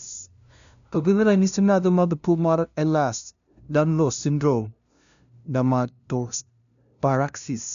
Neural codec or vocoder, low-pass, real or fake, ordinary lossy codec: codec, 16 kHz, 0.5 kbps, FunCodec, trained on LibriTTS, 25 frames a second; 7.2 kHz; fake; none